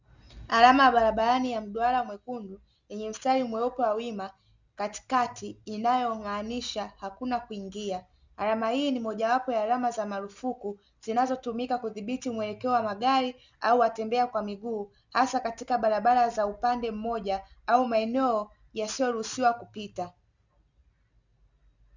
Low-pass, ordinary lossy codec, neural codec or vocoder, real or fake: 7.2 kHz; Opus, 64 kbps; none; real